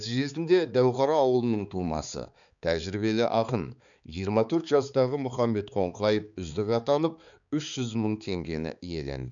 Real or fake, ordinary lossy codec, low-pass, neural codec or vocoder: fake; none; 7.2 kHz; codec, 16 kHz, 4 kbps, X-Codec, HuBERT features, trained on balanced general audio